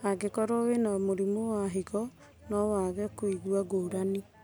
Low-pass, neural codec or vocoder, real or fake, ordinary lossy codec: none; none; real; none